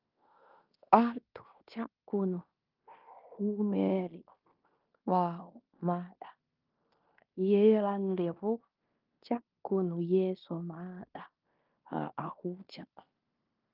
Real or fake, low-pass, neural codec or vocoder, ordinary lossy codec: fake; 5.4 kHz; codec, 16 kHz in and 24 kHz out, 0.9 kbps, LongCat-Audio-Codec, fine tuned four codebook decoder; Opus, 24 kbps